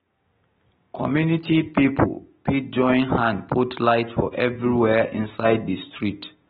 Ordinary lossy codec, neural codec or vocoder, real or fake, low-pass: AAC, 16 kbps; none; real; 19.8 kHz